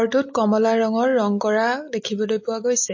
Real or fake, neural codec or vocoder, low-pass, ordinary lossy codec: real; none; 7.2 kHz; MP3, 32 kbps